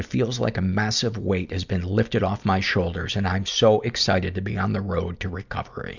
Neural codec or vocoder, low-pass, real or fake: none; 7.2 kHz; real